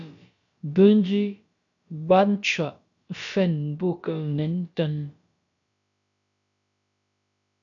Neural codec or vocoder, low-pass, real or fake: codec, 16 kHz, about 1 kbps, DyCAST, with the encoder's durations; 7.2 kHz; fake